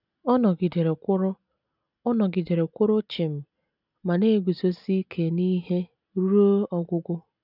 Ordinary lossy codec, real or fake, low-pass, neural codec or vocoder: none; real; 5.4 kHz; none